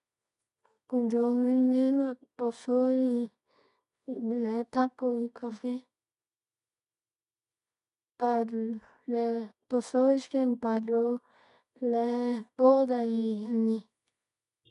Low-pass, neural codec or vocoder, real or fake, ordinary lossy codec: 10.8 kHz; codec, 24 kHz, 0.9 kbps, WavTokenizer, medium music audio release; fake; none